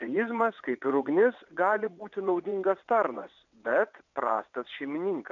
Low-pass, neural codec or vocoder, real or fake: 7.2 kHz; vocoder, 22.05 kHz, 80 mel bands, WaveNeXt; fake